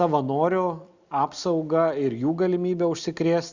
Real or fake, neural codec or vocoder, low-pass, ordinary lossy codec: real; none; 7.2 kHz; Opus, 64 kbps